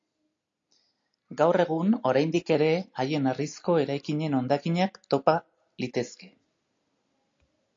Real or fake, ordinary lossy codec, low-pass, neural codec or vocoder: real; AAC, 48 kbps; 7.2 kHz; none